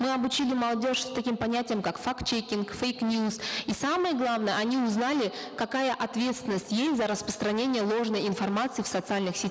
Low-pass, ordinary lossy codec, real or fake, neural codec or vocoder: none; none; real; none